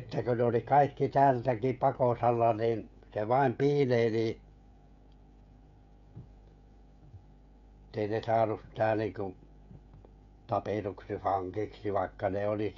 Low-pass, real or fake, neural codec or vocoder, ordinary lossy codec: 7.2 kHz; fake; codec, 16 kHz, 16 kbps, FreqCodec, smaller model; none